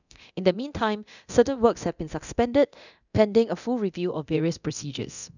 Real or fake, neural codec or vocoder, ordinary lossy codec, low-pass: fake; codec, 24 kHz, 0.9 kbps, DualCodec; none; 7.2 kHz